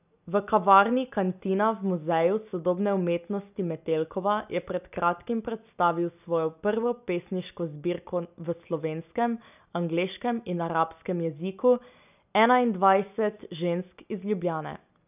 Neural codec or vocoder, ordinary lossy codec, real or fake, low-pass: none; none; real; 3.6 kHz